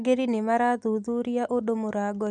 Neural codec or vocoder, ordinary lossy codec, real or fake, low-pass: none; none; real; 10.8 kHz